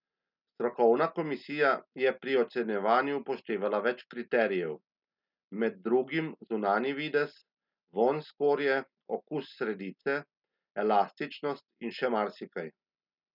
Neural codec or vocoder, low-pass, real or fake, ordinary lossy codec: none; 5.4 kHz; real; none